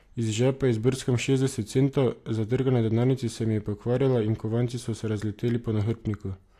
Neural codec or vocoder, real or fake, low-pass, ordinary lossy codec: none; real; 14.4 kHz; AAC, 64 kbps